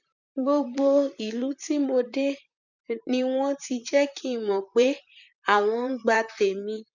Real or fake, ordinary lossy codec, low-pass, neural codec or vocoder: fake; none; 7.2 kHz; vocoder, 44.1 kHz, 128 mel bands, Pupu-Vocoder